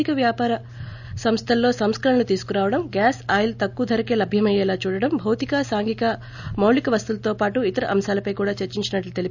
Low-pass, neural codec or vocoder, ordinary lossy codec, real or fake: 7.2 kHz; none; none; real